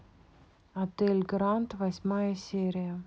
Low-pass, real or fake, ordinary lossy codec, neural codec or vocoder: none; real; none; none